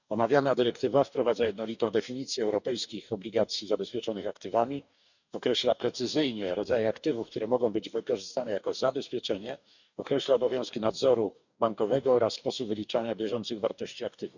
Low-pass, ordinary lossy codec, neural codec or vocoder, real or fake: 7.2 kHz; none; codec, 44.1 kHz, 2.6 kbps, DAC; fake